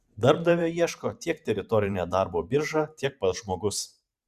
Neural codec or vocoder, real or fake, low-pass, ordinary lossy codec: vocoder, 44.1 kHz, 128 mel bands every 512 samples, BigVGAN v2; fake; 14.4 kHz; Opus, 64 kbps